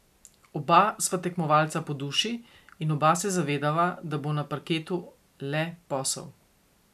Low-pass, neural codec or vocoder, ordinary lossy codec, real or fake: 14.4 kHz; none; none; real